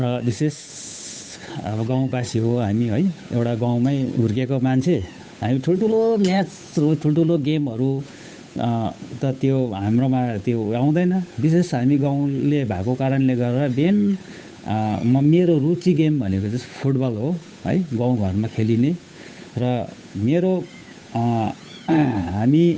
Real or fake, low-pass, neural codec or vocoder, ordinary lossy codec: fake; none; codec, 16 kHz, 8 kbps, FunCodec, trained on Chinese and English, 25 frames a second; none